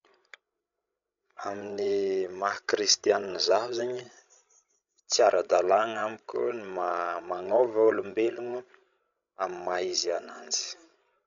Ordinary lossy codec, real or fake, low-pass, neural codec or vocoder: none; fake; 7.2 kHz; codec, 16 kHz, 16 kbps, FreqCodec, larger model